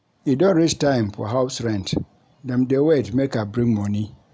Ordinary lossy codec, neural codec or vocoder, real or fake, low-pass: none; none; real; none